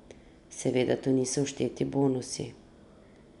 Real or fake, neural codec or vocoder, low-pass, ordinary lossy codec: real; none; 10.8 kHz; MP3, 96 kbps